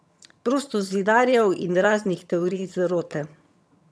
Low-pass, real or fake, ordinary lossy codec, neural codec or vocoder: none; fake; none; vocoder, 22.05 kHz, 80 mel bands, HiFi-GAN